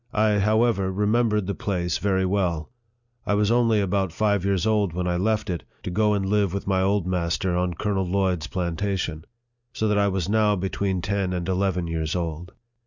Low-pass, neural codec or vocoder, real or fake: 7.2 kHz; none; real